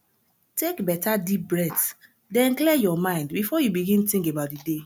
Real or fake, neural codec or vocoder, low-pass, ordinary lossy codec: real; none; none; none